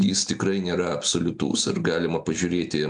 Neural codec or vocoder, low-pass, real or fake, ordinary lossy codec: none; 9.9 kHz; real; AAC, 64 kbps